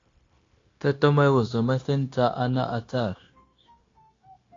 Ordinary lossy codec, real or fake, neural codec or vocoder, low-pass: AAC, 32 kbps; fake; codec, 16 kHz, 0.9 kbps, LongCat-Audio-Codec; 7.2 kHz